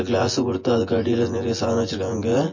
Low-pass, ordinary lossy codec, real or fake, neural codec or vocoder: 7.2 kHz; MP3, 32 kbps; fake; vocoder, 24 kHz, 100 mel bands, Vocos